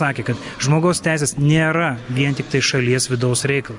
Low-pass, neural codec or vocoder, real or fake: 10.8 kHz; none; real